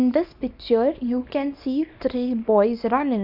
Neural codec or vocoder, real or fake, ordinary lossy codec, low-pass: codec, 24 kHz, 0.9 kbps, WavTokenizer, small release; fake; none; 5.4 kHz